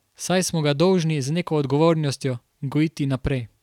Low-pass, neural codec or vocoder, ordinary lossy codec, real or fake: 19.8 kHz; none; none; real